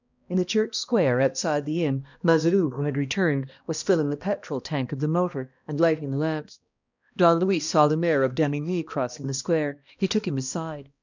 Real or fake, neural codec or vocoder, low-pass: fake; codec, 16 kHz, 1 kbps, X-Codec, HuBERT features, trained on balanced general audio; 7.2 kHz